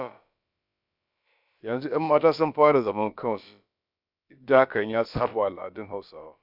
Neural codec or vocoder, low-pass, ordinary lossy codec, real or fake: codec, 16 kHz, about 1 kbps, DyCAST, with the encoder's durations; 5.4 kHz; none; fake